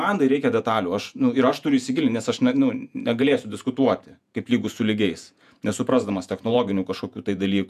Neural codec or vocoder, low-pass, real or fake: vocoder, 48 kHz, 128 mel bands, Vocos; 14.4 kHz; fake